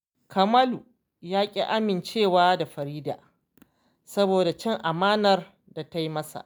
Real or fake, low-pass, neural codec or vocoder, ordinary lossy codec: real; none; none; none